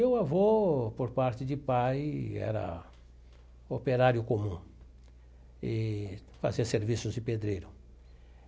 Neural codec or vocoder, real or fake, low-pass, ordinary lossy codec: none; real; none; none